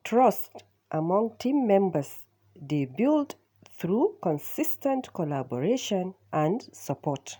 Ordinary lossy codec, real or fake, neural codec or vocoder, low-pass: none; real; none; none